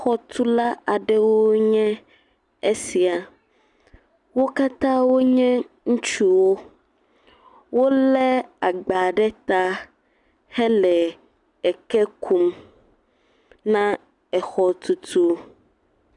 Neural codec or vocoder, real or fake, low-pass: none; real; 10.8 kHz